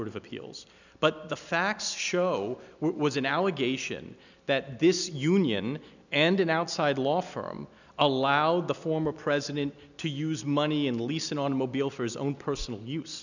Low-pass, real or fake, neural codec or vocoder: 7.2 kHz; real; none